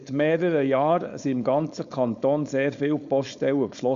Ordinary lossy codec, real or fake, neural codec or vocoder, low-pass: Opus, 64 kbps; fake; codec, 16 kHz, 4.8 kbps, FACodec; 7.2 kHz